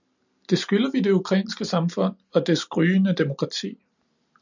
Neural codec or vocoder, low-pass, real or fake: none; 7.2 kHz; real